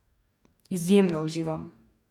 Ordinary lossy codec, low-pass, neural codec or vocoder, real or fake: none; 19.8 kHz; codec, 44.1 kHz, 2.6 kbps, DAC; fake